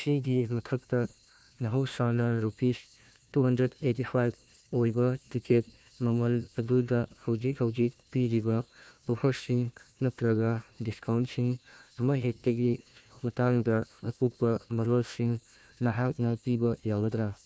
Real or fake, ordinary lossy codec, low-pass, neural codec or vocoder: fake; none; none; codec, 16 kHz, 1 kbps, FunCodec, trained on Chinese and English, 50 frames a second